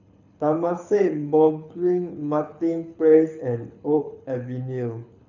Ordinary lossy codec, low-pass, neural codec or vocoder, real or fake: none; 7.2 kHz; codec, 24 kHz, 6 kbps, HILCodec; fake